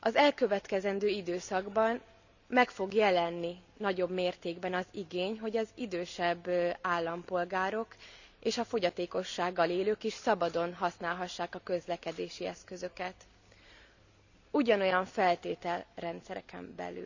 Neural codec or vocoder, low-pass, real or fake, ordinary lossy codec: none; 7.2 kHz; real; none